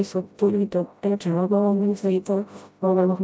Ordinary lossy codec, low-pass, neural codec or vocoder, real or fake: none; none; codec, 16 kHz, 0.5 kbps, FreqCodec, smaller model; fake